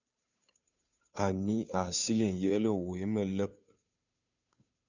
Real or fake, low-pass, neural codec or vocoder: fake; 7.2 kHz; codec, 16 kHz, 2 kbps, FunCodec, trained on Chinese and English, 25 frames a second